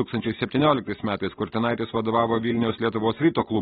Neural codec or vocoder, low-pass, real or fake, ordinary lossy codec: none; 7.2 kHz; real; AAC, 16 kbps